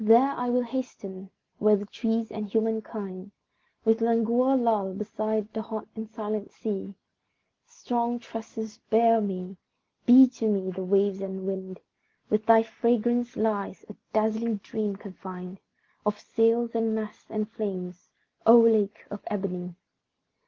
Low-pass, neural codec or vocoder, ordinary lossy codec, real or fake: 7.2 kHz; none; Opus, 16 kbps; real